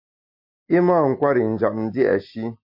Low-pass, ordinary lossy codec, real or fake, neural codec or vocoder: 5.4 kHz; MP3, 32 kbps; real; none